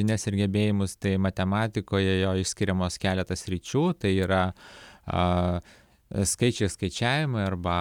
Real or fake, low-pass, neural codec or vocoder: real; 19.8 kHz; none